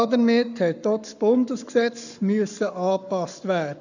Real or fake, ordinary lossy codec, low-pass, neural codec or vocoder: fake; none; 7.2 kHz; codec, 44.1 kHz, 7.8 kbps, Pupu-Codec